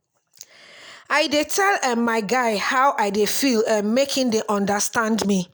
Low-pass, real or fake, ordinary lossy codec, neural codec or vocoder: none; real; none; none